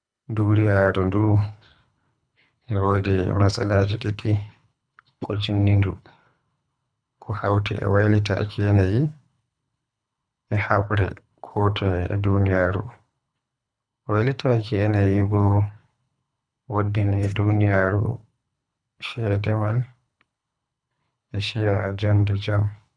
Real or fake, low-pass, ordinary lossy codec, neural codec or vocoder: fake; 9.9 kHz; none; codec, 24 kHz, 3 kbps, HILCodec